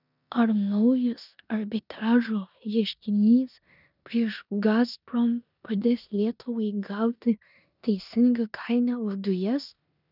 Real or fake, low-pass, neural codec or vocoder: fake; 5.4 kHz; codec, 16 kHz in and 24 kHz out, 0.9 kbps, LongCat-Audio-Codec, four codebook decoder